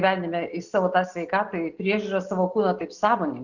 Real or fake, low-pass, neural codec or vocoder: real; 7.2 kHz; none